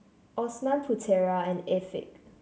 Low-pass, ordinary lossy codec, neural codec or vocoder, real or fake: none; none; none; real